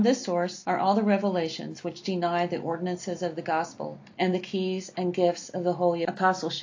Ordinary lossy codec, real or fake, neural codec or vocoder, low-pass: AAC, 48 kbps; real; none; 7.2 kHz